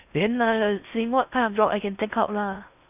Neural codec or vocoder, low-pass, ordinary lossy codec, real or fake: codec, 16 kHz in and 24 kHz out, 0.8 kbps, FocalCodec, streaming, 65536 codes; 3.6 kHz; none; fake